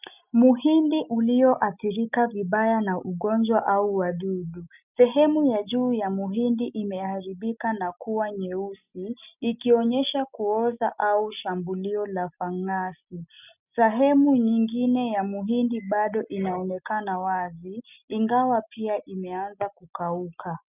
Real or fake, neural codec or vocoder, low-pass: real; none; 3.6 kHz